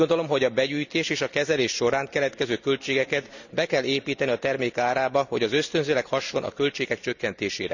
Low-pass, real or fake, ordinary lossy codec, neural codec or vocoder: 7.2 kHz; real; none; none